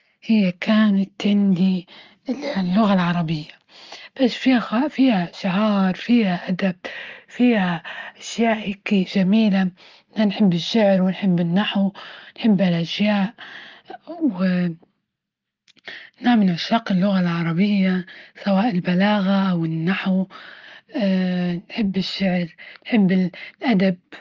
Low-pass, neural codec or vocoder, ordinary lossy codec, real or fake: 7.2 kHz; none; Opus, 32 kbps; real